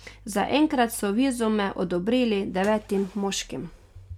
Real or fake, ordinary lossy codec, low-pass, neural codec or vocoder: real; none; 19.8 kHz; none